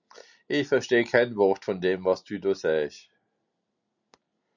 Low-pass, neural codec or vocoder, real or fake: 7.2 kHz; none; real